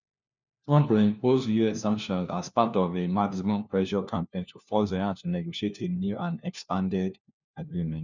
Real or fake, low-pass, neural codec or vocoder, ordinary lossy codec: fake; 7.2 kHz; codec, 16 kHz, 1 kbps, FunCodec, trained on LibriTTS, 50 frames a second; none